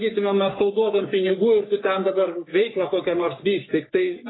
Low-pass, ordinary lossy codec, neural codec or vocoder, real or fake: 7.2 kHz; AAC, 16 kbps; codec, 44.1 kHz, 3.4 kbps, Pupu-Codec; fake